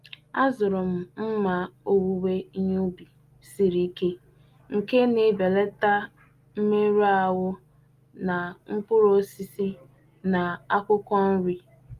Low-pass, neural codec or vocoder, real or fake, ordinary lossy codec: 14.4 kHz; none; real; Opus, 32 kbps